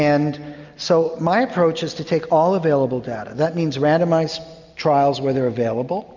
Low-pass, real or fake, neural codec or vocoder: 7.2 kHz; real; none